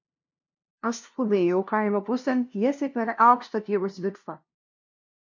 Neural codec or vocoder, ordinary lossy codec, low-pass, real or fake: codec, 16 kHz, 0.5 kbps, FunCodec, trained on LibriTTS, 25 frames a second; MP3, 48 kbps; 7.2 kHz; fake